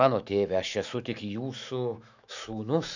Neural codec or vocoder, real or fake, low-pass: autoencoder, 48 kHz, 128 numbers a frame, DAC-VAE, trained on Japanese speech; fake; 7.2 kHz